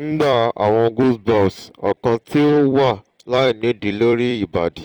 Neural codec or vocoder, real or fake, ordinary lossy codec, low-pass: vocoder, 44.1 kHz, 128 mel bands every 256 samples, BigVGAN v2; fake; Opus, 24 kbps; 19.8 kHz